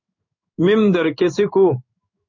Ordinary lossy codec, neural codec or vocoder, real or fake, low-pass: MP3, 64 kbps; codec, 16 kHz in and 24 kHz out, 1 kbps, XY-Tokenizer; fake; 7.2 kHz